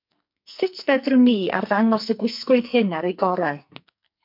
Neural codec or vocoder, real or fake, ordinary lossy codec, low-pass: codec, 44.1 kHz, 2.6 kbps, SNAC; fake; MP3, 48 kbps; 5.4 kHz